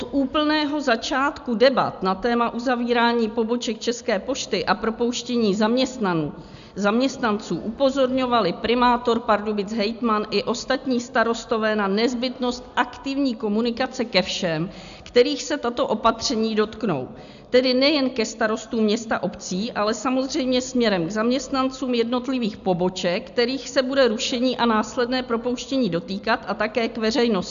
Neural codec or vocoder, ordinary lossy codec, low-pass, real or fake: none; AAC, 96 kbps; 7.2 kHz; real